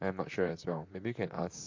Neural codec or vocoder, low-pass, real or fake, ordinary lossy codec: vocoder, 44.1 kHz, 128 mel bands, Pupu-Vocoder; 7.2 kHz; fake; MP3, 48 kbps